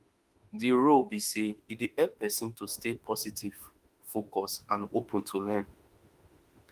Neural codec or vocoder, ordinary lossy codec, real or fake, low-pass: autoencoder, 48 kHz, 32 numbers a frame, DAC-VAE, trained on Japanese speech; Opus, 32 kbps; fake; 14.4 kHz